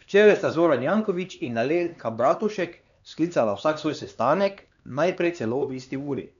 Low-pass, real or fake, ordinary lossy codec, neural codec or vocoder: 7.2 kHz; fake; none; codec, 16 kHz, 2 kbps, X-Codec, HuBERT features, trained on LibriSpeech